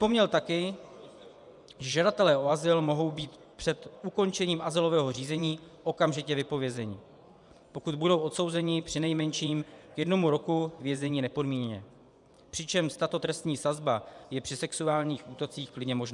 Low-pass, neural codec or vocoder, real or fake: 10.8 kHz; vocoder, 24 kHz, 100 mel bands, Vocos; fake